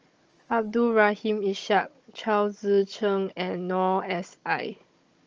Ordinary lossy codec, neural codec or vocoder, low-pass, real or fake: Opus, 24 kbps; codec, 16 kHz, 16 kbps, FunCodec, trained on Chinese and English, 50 frames a second; 7.2 kHz; fake